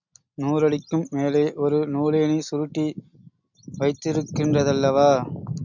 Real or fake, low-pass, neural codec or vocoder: real; 7.2 kHz; none